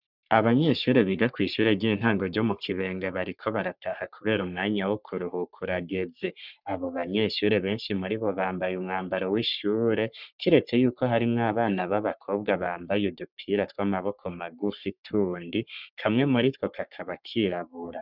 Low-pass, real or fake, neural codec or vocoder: 5.4 kHz; fake; codec, 44.1 kHz, 3.4 kbps, Pupu-Codec